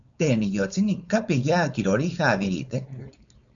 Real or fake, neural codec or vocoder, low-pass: fake; codec, 16 kHz, 4.8 kbps, FACodec; 7.2 kHz